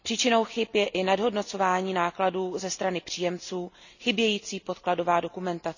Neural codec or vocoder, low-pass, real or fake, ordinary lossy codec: none; 7.2 kHz; real; none